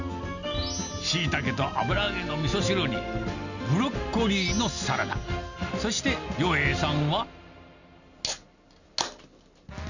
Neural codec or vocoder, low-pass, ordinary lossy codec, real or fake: none; 7.2 kHz; none; real